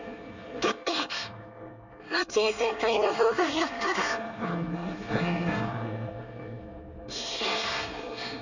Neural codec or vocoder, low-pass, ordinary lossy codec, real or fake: codec, 24 kHz, 1 kbps, SNAC; 7.2 kHz; none; fake